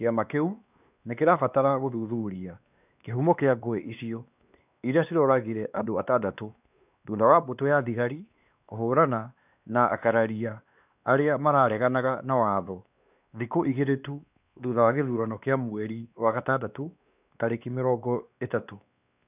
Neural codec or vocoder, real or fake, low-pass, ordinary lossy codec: codec, 16 kHz, 2 kbps, X-Codec, WavLM features, trained on Multilingual LibriSpeech; fake; 3.6 kHz; none